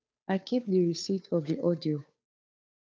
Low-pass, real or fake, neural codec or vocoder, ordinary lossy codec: none; fake; codec, 16 kHz, 2 kbps, FunCodec, trained on Chinese and English, 25 frames a second; none